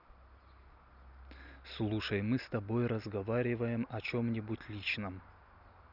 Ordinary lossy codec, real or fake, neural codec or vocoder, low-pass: none; real; none; 5.4 kHz